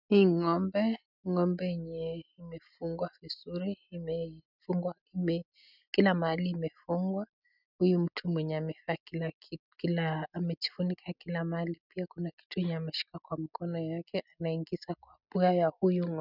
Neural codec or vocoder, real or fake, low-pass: none; real; 5.4 kHz